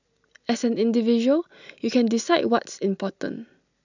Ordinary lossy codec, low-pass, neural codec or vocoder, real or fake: none; 7.2 kHz; none; real